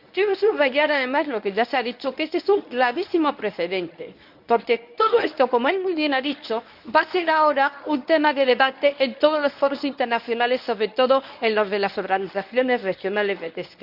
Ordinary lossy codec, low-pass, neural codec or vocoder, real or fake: none; 5.4 kHz; codec, 24 kHz, 0.9 kbps, WavTokenizer, medium speech release version 1; fake